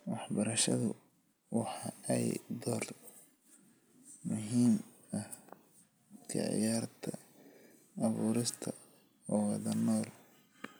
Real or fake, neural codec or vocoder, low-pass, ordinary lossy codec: real; none; none; none